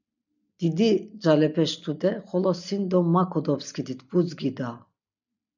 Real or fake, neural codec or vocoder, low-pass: real; none; 7.2 kHz